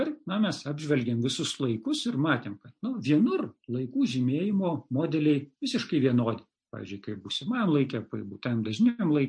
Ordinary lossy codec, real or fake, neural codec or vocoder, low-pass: MP3, 48 kbps; real; none; 9.9 kHz